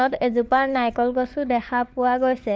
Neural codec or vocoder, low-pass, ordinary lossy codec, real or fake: codec, 16 kHz, 4 kbps, FunCodec, trained on LibriTTS, 50 frames a second; none; none; fake